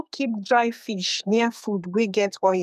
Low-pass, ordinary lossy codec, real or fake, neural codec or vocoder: 14.4 kHz; none; fake; codec, 32 kHz, 1.9 kbps, SNAC